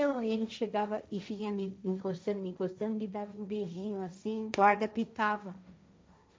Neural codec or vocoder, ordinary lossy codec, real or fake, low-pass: codec, 16 kHz, 1.1 kbps, Voila-Tokenizer; none; fake; none